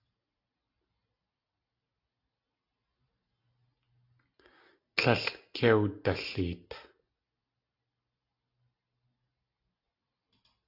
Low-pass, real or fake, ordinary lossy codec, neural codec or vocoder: 5.4 kHz; real; AAC, 32 kbps; none